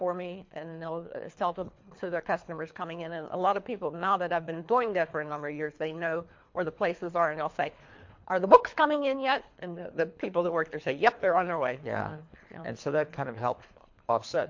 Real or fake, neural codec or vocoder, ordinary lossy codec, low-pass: fake; codec, 24 kHz, 3 kbps, HILCodec; MP3, 48 kbps; 7.2 kHz